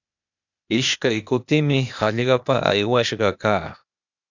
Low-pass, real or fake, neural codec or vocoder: 7.2 kHz; fake; codec, 16 kHz, 0.8 kbps, ZipCodec